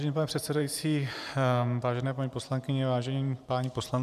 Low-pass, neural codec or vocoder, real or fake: 14.4 kHz; vocoder, 44.1 kHz, 128 mel bands every 512 samples, BigVGAN v2; fake